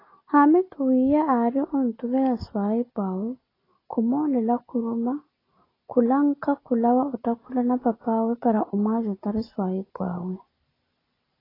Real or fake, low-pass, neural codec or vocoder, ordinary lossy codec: real; 5.4 kHz; none; AAC, 24 kbps